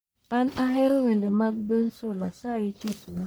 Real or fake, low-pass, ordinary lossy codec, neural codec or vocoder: fake; none; none; codec, 44.1 kHz, 1.7 kbps, Pupu-Codec